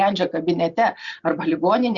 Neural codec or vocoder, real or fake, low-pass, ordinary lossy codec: none; real; 7.2 kHz; Opus, 64 kbps